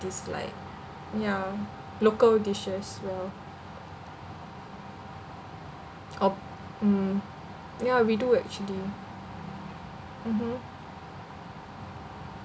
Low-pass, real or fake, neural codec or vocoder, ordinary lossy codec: none; real; none; none